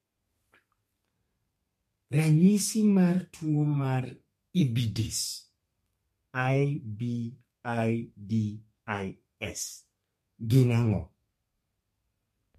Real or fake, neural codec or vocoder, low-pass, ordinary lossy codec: fake; codec, 32 kHz, 1.9 kbps, SNAC; 14.4 kHz; MP3, 64 kbps